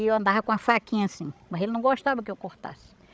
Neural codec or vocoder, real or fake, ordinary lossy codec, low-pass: codec, 16 kHz, 16 kbps, FreqCodec, larger model; fake; none; none